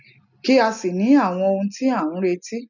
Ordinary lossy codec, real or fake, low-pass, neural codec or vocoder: none; real; 7.2 kHz; none